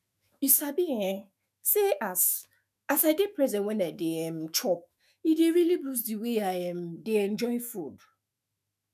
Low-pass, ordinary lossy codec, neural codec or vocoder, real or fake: 14.4 kHz; none; autoencoder, 48 kHz, 128 numbers a frame, DAC-VAE, trained on Japanese speech; fake